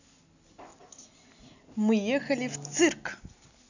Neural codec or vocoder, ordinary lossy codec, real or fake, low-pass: none; none; real; 7.2 kHz